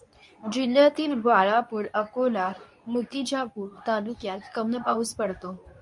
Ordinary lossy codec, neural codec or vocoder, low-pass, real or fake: MP3, 48 kbps; codec, 24 kHz, 0.9 kbps, WavTokenizer, medium speech release version 2; 10.8 kHz; fake